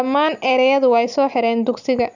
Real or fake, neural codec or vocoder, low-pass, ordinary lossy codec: real; none; 7.2 kHz; none